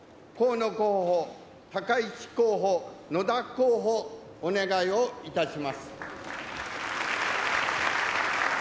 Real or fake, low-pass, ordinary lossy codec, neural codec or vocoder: real; none; none; none